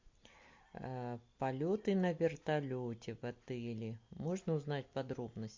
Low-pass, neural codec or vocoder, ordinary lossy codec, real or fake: 7.2 kHz; none; MP3, 48 kbps; real